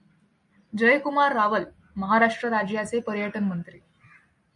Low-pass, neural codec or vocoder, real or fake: 10.8 kHz; none; real